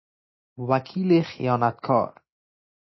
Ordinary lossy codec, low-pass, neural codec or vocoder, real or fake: MP3, 24 kbps; 7.2 kHz; none; real